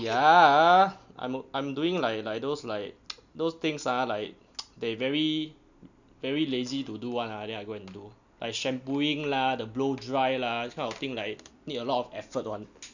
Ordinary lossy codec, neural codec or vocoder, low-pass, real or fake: none; none; 7.2 kHz; real